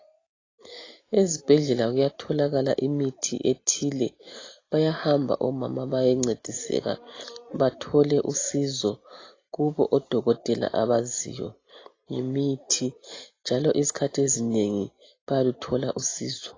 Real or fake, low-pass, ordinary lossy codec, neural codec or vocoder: real; 7.2 kHz; AAC, 32 kbps; none